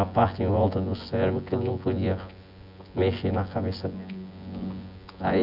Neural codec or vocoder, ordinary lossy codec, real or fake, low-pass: vocoder, 24 kHz, 100 mel bands, Vocos; none; fake; 5.4 kHz